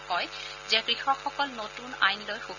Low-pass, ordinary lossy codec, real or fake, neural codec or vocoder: 7.2 kHz; none; real; none